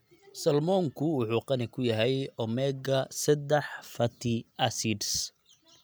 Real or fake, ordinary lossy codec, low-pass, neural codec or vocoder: real; none; none; none